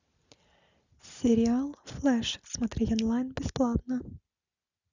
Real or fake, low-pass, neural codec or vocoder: real; 7.2 kHz; none